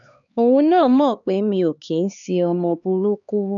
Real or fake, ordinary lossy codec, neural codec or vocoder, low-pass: fake; none; codec, 16 kHz, 2 kbps, X-Codec, HuBERT features, trained on LibriSpeech; 7.2 kHz